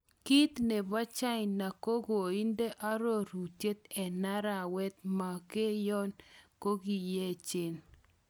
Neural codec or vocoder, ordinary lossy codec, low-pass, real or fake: none; none; none; real